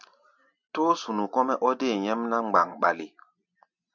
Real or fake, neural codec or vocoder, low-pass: real; none; 7.2 kHz